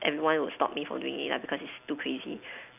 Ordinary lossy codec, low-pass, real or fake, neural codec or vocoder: none; 3.6 kHz; real; none